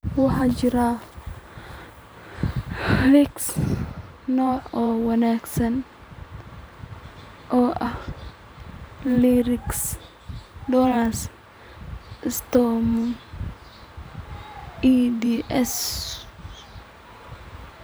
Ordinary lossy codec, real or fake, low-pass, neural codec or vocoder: none; fake; none; vocoder, 44.1 kHz, 128 mel bands every 512 samples, BigVGAN v2